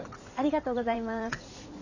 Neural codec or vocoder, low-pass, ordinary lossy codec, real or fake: vocoder, 44.1 kHz, 80 mel bands, Vocos; 7.2 kHz; none; fake